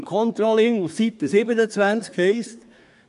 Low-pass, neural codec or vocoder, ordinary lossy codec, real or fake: 10.8 kHz; codec, 24 kHz, 1 kbps, SNAC; none; fake